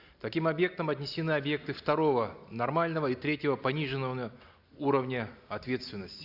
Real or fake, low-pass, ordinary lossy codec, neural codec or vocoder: real; 5.4 kHz; Opus, 64 kbps; none